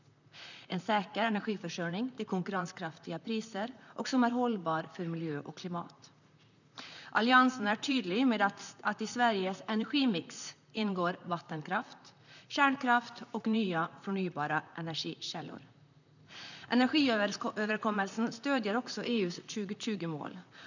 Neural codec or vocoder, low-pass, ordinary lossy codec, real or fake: vocoder, 44.1 kHz, 128 mel bands, Pupu-Vocoder; 7.2 kHz; none; fake